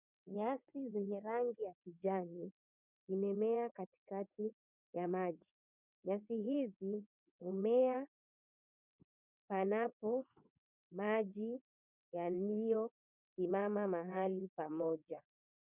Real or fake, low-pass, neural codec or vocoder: fake; 3.6 kHz; vocoder, 22.05 kHz, 80 mel bands, WaveNeXt